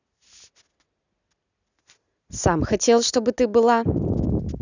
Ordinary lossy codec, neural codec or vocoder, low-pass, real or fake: none; none; 7.2 kHz; real